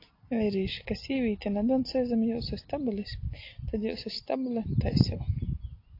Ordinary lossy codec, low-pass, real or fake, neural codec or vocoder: AAC, 32 kbps; 5.4 kHz; real; none